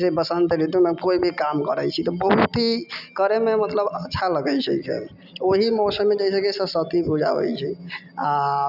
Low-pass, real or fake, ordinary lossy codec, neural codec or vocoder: 5.4 kHz; real; none; none